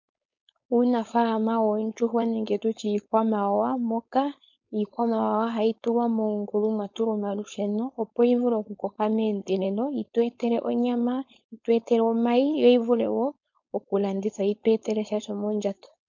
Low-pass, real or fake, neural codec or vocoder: 7.2 kHz; fake; codec, 16 kHz, 4.8 kbps, FACodec